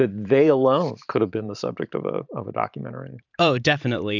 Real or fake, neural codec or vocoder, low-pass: fake; vocoder, 44.1 kHz, 128 mel bands every 512 samples, BigVGAN v2; 7.2 kHz